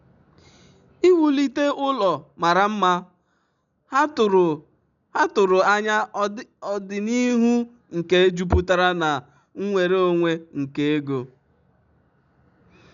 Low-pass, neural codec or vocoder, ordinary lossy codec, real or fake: 7.2 kHz; none; none; real